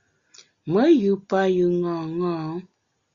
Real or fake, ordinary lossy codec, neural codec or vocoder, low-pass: real; Opus, 64 kbps; none; 7.2 kHz